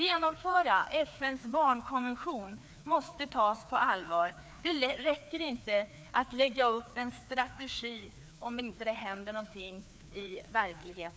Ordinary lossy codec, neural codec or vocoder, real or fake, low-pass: none; codec, 16 kHz, 2 kbps, FreqCodec, larger model; fake; none